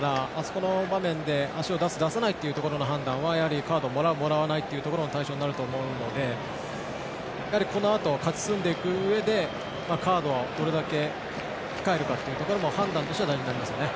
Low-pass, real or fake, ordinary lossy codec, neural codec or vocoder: none; real; none; none